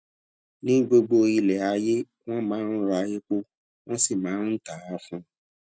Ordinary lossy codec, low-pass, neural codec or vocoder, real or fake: none; none; none; real